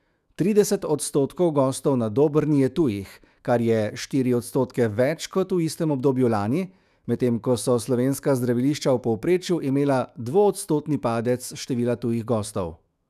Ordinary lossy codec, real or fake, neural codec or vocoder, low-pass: none; fake; autoencoder, 48 kHz, 128 numbers a frame, DAC-VAE, trained on Japanese speech; 14.4 kHz